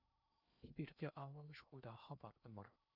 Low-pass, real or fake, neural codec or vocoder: 5.4 kHz; fake; codec, 16 kHz in and 24 kHz out, 0.6 kbps, FocalCodec, streaming, 2048 codes